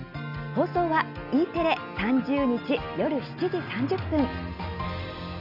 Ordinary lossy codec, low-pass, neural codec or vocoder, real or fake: none; 5.4 kHz; none; real